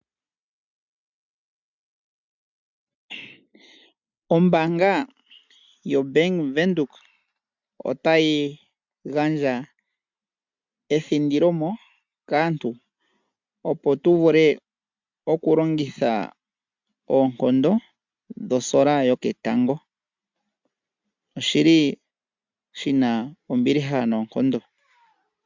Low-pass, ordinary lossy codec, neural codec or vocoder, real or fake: 7.2 kHz; MP3, 64 kbps; none; real